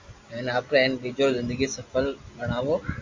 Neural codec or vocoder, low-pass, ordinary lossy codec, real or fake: none; 7.2 kHz; MP3, 48 kbps; real